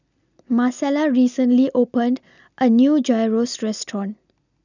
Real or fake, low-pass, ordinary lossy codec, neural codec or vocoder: real; 7.2 kHz; none; none